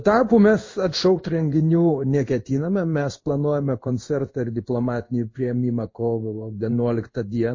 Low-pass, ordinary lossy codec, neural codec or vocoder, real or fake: 7.2 kHz; MP3, 32 kbps; codec, 16 kHz in and 24 kHz out, 1 kbps, XY-Tokenizer; fake